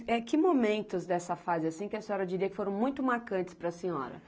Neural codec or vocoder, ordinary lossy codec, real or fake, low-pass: none; none; real; none